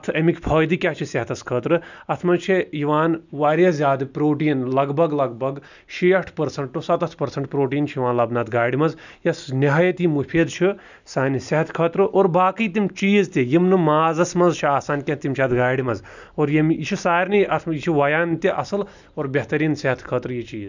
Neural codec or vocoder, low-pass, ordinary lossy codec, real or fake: none; 7.2 kHz; none; real